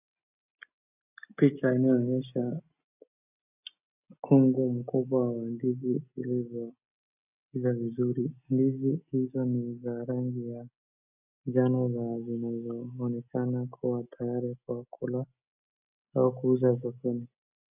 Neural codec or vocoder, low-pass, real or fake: none; 3.6 kHz; real